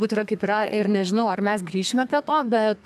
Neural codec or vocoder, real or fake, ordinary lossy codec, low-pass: codec, 32 kHz, 1.9 kbps, SNAC; fake; AAC, 96 kbps; 14.4 kHz